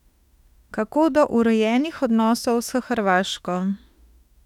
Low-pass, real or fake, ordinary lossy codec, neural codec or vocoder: 19.8 kHz; fake; none; autoencoder, 48 kHz, 32 numbers a frame, DAC-VAE, trained on Japanese speech